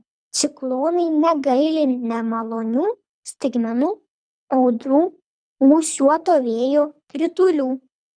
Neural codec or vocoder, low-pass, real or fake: codec, 24 kHz, 3 kbps, HILCodec; 9.9 kHz; fake